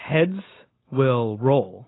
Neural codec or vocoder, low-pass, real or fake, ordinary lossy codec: none; 7.2 kHz; real; AAC, 16 kbps